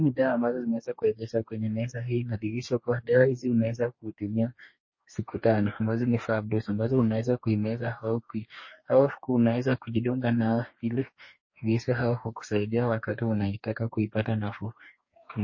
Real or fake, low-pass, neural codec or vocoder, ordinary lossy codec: fake; 7.2 kHz; codec, 44.1 kHz, 2.6 kbps, DAC; MP3, 32 kbps